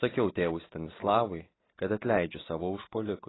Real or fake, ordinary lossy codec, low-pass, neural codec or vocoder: real; AAC, 16 kbps; 7.2 kHz; none